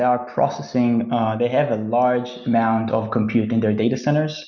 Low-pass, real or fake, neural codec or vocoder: 7.2 kHz; real; none